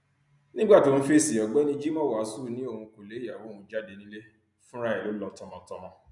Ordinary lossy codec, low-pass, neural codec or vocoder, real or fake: none; 10.8 kHz; none; real